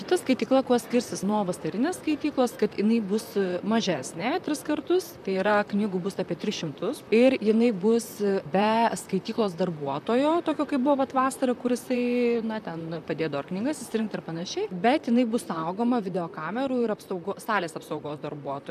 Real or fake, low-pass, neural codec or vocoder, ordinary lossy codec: fake; 14.4 kHz; vocoder, 44.1 kHz, 128 mel bands, Pupu-Vocoder; MP3, 96 kbps